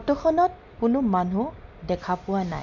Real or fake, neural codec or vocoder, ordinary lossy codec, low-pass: real; none; none; 7.2 kHz